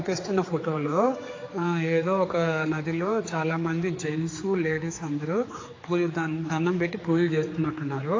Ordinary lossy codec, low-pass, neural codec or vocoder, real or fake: AAC, 32 kbps; 7.2 kHz; codec, 16 kHz, 4 kbps, X-Codec, HuBERT features, trained on general audio; fake